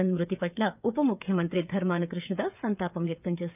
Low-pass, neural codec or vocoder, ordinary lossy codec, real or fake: 3.6 kHz; codec, 16 kHz, 8 kbps, FreqCodec, smaller model; none; fake